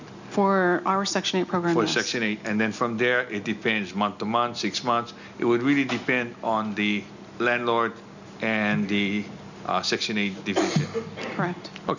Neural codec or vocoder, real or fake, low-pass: none; real; 7.2 kHz